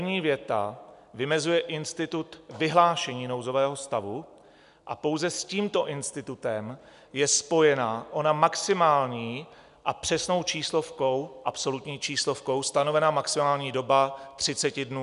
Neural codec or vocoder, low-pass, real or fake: none; 10.8 kHz; real